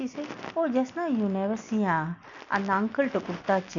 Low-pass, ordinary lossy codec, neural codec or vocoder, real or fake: 7.2 kHz; none; none; real